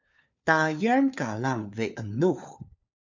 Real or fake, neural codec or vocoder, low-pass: fake; codec, 16 kHz, 4 kbps, FunCodec, trained on LibriTTS, 50 frames a second; 7.2 kHz